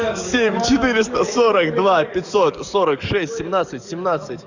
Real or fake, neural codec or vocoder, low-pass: fake; codec, 44.1 kHz, 7.8 kbps, DAC; 7.2 kHz